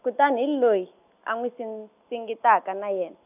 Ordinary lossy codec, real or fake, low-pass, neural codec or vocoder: none; real; 3.6 kHz; none